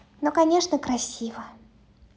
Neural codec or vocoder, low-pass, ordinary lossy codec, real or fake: none; none; none; real